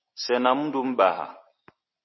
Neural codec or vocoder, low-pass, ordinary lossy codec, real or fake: none; 7.2 kHz; MP3, 24 kbps; real